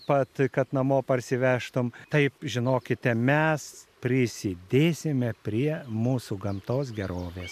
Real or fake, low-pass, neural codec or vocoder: real; 14.4 kHz; none